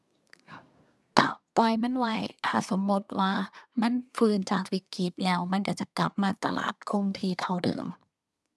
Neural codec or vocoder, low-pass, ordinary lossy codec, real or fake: codec, 24 kHz, 1 kbps, SNAC; none; none; fake